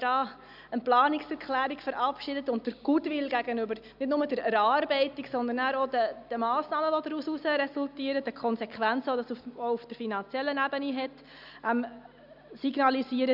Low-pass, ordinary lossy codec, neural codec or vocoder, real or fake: 5.4 kHz; none; none; real